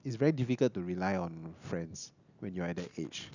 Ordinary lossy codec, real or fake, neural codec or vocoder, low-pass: none; real; none; 7.2 kHz